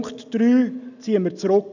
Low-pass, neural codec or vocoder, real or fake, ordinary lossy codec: 7.2 kHz; none; real; none